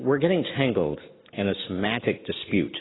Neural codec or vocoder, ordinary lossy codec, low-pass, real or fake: none; AAC, 16 kbps; 7.2 kHz; real